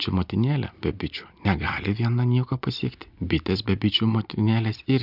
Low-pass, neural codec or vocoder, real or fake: 5.4 kHz; none; real